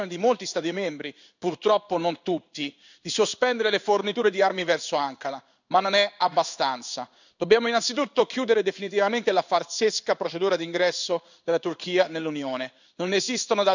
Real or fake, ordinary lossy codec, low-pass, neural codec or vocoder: fake; none; 7.2 kHz; codec, 16 kHz in and 24 kHz out, 1 kbps, XY-Tokenizer